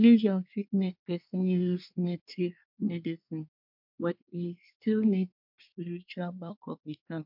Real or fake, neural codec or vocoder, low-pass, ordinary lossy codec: fake; codec, 24 kHz, 1 kbps, SNAC; 5.4 kHz; MP3, 48 kbps